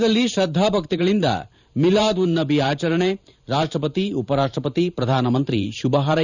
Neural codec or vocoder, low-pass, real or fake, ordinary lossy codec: vocoder, 44.1 kHz, 128 mel bands every 512 samples, BigVGAN v2; 7.2 kHz; fake; none